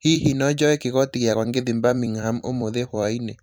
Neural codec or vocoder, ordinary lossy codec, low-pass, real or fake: vocoder, 44.1 kHz, 128 mel bands every 256 samples, BigVGAN v2; none; none; fake